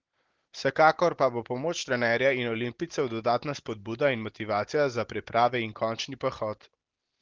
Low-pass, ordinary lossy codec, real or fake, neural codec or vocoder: 7.2 kHz; Opus, 16 kbps; real; none